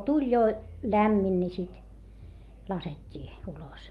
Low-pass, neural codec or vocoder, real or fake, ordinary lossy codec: 19.8 kHz; none; real; Opus, 32 kbps